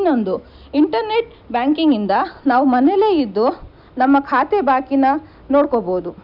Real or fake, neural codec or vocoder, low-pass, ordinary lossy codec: real; none; 5.4 kHz; none